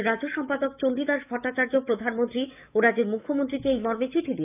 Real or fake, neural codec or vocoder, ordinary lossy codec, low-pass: fake; autoencoder, 48 kHz, 128 numbers a frame, DAC-VAE, trained on Japanese speech; none; 3.6 kHz